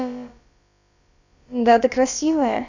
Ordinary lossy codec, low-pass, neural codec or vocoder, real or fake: none; 7.2 kHz; codec, 16 kHz, about 1 kbps, DyCAST, with the encoder's durations; fake